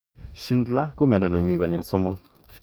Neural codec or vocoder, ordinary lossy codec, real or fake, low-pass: codec, 44.1 kHz, 2.6 kbps, DAC; none; fake; none